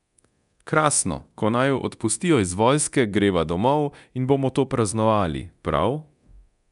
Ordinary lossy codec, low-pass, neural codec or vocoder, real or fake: none; 10.8 kHz; codec, 24 kHz, 0.9 kbps, DualCodec; fake